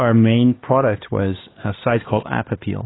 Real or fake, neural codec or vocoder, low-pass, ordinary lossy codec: fake; codec, 16 kHz, 2 kbps, X-Codec, HuBERT features, trained on LibriSpeech; 7.2 kHz; AAC, 16 kbps